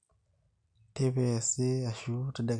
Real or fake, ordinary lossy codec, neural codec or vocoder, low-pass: real; none; none; 9.9 kHz